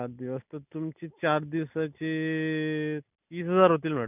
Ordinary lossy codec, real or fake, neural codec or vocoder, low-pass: none; real; none; 3.6 kHz